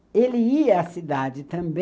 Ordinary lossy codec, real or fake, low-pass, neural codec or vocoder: none; real; none; none